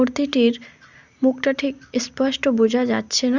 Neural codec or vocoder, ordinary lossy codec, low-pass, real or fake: none; Opus, 64 kbps; 7.2 kHz; real